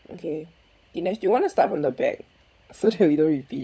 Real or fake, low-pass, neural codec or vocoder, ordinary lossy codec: fake; none; codec, 16 kHz, 16 kbps, FunCodec, trained on LibriTTS, 50 frames a second; none